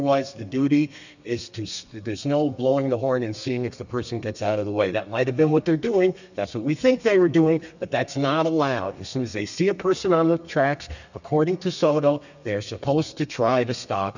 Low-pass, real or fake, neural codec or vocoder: 7.2 kHz; fake; codec, 32 kHz, 1.9 kbps, SNAC